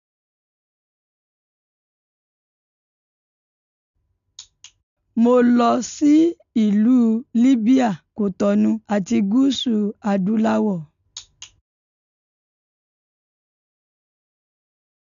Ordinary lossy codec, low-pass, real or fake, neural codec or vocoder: none; 7.2 kHz; real; none